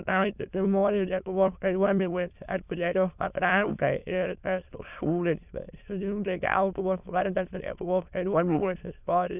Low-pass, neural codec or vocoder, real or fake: 3.6 kHz; autoencoder, 22.05 kHz, a latent of 192 numbers a frame, VITS, trained on many speakers; fake